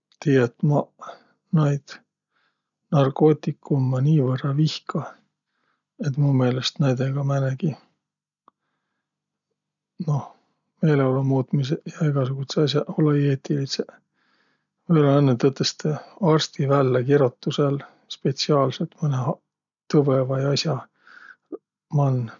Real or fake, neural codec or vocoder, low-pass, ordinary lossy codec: real; none; 7.2 kHz; none